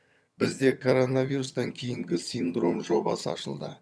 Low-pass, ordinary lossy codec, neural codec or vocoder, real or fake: none; none; vocoder, 22.05 kHz, 80 mel bands, HiFi-GAN; fake